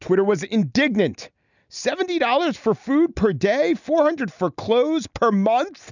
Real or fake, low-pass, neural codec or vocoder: real; 7.2 kHz; none